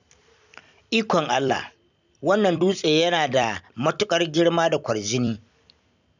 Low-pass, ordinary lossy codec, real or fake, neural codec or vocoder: 7.2 kHz; none; fake; vocoder, 44.1 kHz, 128 mel bands every 256 samples, BigVGAN v2